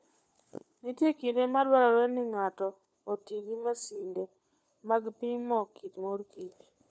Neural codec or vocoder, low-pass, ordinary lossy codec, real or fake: codec, 16 kHz, 4 kbps, FunCodec, trained on LibriTTS, 50 frames a second; none; none; fake